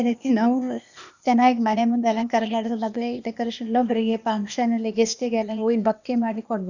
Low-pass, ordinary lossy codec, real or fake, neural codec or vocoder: 7.2 kHz; none; fake; codec, 16 kHz, 0.8 kbps, ZipCodec